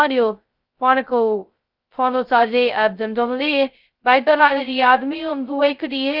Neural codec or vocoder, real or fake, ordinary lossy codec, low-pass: codec, 16 kHz, 0.2 kbps, FocalCodec; fake; Opus, 24 kbps; 5.4 kHz